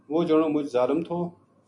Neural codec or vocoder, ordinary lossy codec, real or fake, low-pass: none; MP3, 64 kbps; real; 10.8 kHz